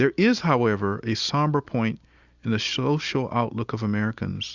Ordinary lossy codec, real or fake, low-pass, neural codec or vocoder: Opus, 64 kbps; real; 7.2 kHz; none